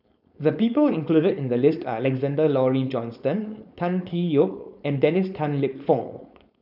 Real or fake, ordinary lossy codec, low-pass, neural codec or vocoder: fake; none; 5.4 kHz; codec, 16 kHz, 4.8 kbps, FACodec